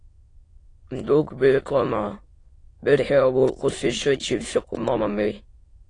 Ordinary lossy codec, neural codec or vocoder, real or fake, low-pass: AAC, 32 kbps; autoencoder, 22.05 kHz, a latent of 192 numbers a frame, VITS, trained on many speakers; fake; 9.9 kHz